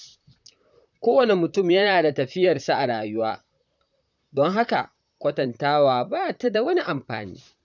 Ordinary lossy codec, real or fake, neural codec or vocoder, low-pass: none; fake; vocoder, 44.1 kHz, 128 mel bands, Pupu-Vocoder; 7.2 kHz